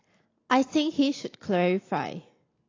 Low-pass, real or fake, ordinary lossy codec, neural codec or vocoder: 7.2 kHz; real; AAC, 32 kbps; none